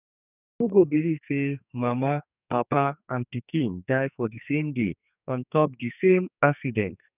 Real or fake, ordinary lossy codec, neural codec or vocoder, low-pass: fake; none; codec, 44.1 kHz, 2.6 kbps, SNAC; 3.6 kHz